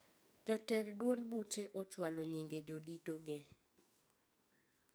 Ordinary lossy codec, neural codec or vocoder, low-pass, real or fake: none; codec, 44.1 kHz, 2.6 kbps, SNAC; none; fake